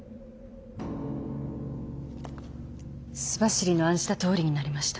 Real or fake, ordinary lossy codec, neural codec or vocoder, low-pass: real; none; none; none